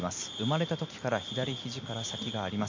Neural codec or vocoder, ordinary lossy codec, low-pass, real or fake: none; MP3, 48 kbps; 7.2 kHz; real